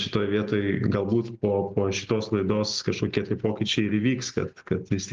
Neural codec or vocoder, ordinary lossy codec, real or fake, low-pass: none; Opus, 32 kbps; real; 7.2 kHz